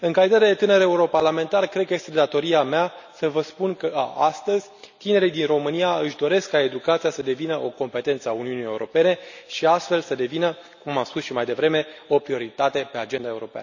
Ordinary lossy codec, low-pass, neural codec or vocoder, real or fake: none; 7.2 kHz; none; real